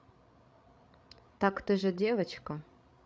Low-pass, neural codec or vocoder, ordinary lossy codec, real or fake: none; codec, 16 kHz, 16 kbps, FreqCodec, larger model; none; fake